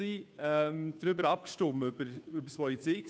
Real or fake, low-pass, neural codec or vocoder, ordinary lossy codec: fake; none; codec, 16 kHz, 2 kbps, FunCodec, trained on Chinese and English, 25 frames a second; none